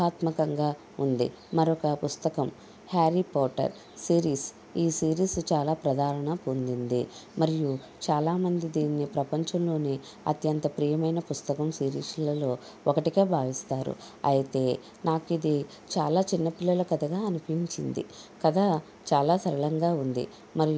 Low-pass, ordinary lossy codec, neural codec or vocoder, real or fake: none; none; none; real